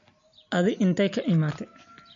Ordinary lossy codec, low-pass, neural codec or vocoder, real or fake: MP3, 48 kbps; 7.2 kHz; none; real